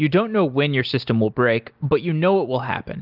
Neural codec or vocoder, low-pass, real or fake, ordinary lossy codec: none; 5.4 kHz; real; Opus, 24 kbps